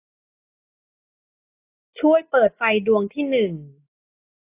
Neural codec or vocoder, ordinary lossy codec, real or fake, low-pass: none; AAC, 24 kbps; real; 3.6 kHz